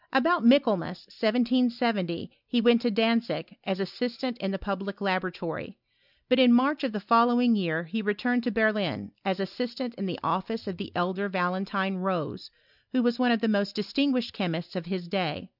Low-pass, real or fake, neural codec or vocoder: 5.4 kHz; real; none